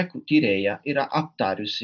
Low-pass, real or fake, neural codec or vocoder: 7.2 kHz; real; none